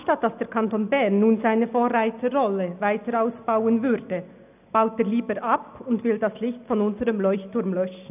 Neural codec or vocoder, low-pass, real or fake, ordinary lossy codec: none; 3.6 kHz; real; none